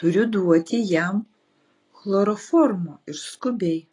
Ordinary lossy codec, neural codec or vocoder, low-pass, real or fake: AAC, 32 kbps; none; 10.8 kHz; real